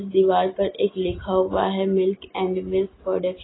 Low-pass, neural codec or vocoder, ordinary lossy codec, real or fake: 7.2 kHz; none; AAC, 16 kbps; real